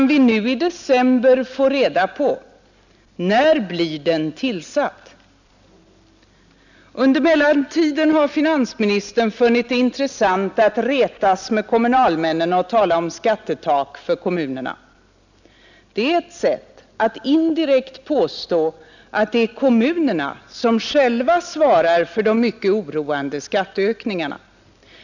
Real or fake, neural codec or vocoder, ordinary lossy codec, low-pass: real; none; none; 7.2 kHz